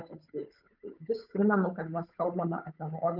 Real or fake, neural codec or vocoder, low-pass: fake; codec, 16 kHz, 8 kbps, FunCodec, trained on Chinese and English, 25 frames a second; 5.4 kHz